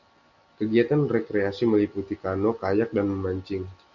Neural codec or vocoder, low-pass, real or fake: none; 7.2 kHz; real